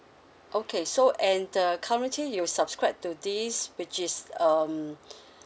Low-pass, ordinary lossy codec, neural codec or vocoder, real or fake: none; none; none; real